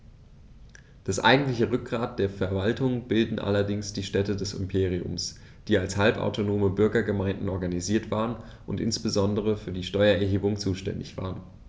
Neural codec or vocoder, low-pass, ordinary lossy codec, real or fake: none; none; none; real